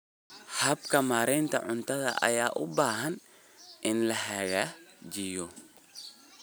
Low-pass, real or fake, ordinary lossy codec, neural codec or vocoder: none; real; none; none